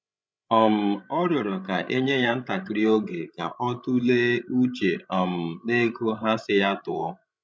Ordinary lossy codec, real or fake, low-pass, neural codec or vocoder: none; fake; none; codec, 16 kHz, 16 kbps, FreqCodec, larger model